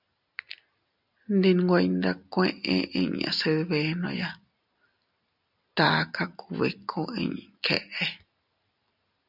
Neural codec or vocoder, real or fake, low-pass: none; real; 5.4 kHz